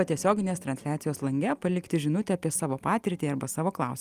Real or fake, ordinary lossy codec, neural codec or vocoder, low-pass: real; Opus, 32 kbps; none; 14.4 kHz